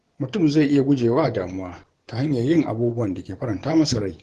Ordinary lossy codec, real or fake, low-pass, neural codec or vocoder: Opus, 16 kbps; fake; 10.8 kHz; vocoder, 24 kHz, 100 mel bands, Vocos